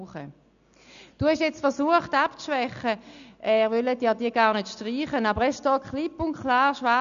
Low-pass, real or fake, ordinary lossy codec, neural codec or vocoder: 7.2 kHz; real; none; none